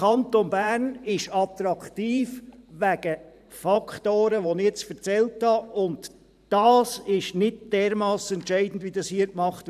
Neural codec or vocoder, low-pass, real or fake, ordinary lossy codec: vocoder, 44.1 kHz, 128 mel bands every 512 samples, BigVGAN v2; 14.4 kHz; fake; none